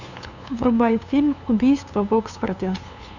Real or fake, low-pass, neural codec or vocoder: fake; 7.2 kHz; codec, 16 kHz, 2 kbps, FunCodec, trained on LibriTTS, 25 frames a second